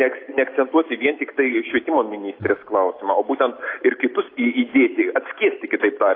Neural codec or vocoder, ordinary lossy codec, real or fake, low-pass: none; AAC, 32 kbps; real; 5.4 kHz